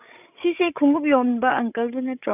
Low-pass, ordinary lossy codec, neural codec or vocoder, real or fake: 3.6 kHz; none; codec, 16 kHz, 16 kbps, FreqCodec, larger model; fake